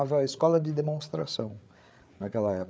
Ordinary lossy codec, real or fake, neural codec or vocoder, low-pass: none; fake; codec, 16 kHz, 8 kbps, FreqCodec, larger model; none